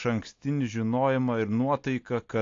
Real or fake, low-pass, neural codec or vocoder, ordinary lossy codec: real; 7.2 kHz; none; AAC, 48 kbps